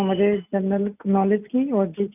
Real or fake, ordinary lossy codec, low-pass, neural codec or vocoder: real; none; 3.6 kHz; none